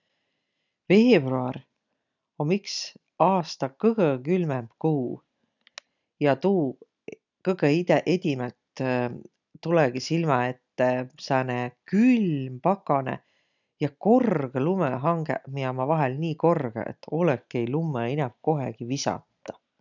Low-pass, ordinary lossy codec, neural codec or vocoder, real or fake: 7.2 kHz; none; none; real